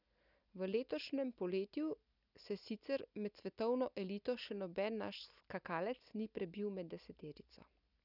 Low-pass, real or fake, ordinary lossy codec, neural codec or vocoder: 5.4 kHz; real; none; none